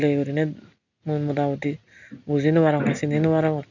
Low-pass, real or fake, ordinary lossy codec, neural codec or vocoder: 7.2 kHz; real; none; none